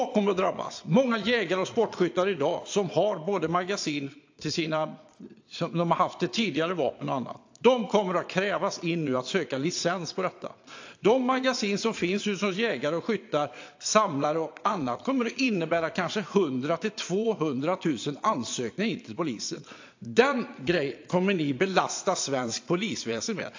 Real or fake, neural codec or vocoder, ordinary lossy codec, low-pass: fake; vocoder, 22.05 kHz, 80 mel bands, Vocos; AAC, 48 kbps; 7.2 kHz